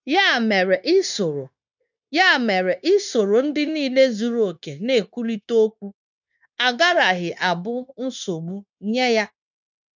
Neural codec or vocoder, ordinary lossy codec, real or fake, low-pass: codec, 16 kHz, 0.9 kbps, LongCat-Audio-Codec; none; fake; 7.2 kHz